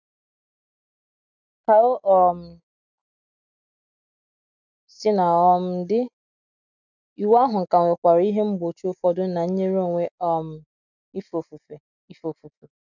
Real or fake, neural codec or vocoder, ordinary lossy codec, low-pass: real; none; none; 7.2 kHz